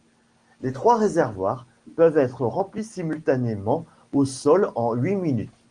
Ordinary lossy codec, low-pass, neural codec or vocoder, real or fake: Opus, 24 kbps; 9.9 kHz; none; real